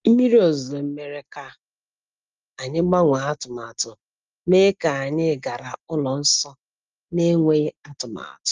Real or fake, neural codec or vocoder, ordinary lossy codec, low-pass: real; none; Opus, 24 kbps; 7.2 kHz